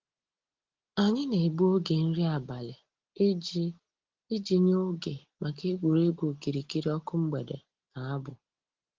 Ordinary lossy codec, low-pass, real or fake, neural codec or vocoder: Opus, 16 kbps; 7.2 kHz; real; none